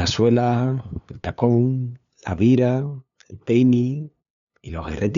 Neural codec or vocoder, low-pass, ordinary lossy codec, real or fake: codec, 16 kHz, 2 kbps, FunCodec, trained on LibriTTS, 25 frames a second; 7.2 kHz; none; fake